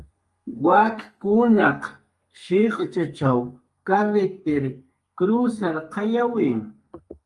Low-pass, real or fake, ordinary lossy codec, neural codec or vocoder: 10.8 kHz; fake; Opus, 32 kbps; codec, 32 kHz, 1.9 kbps, SNAC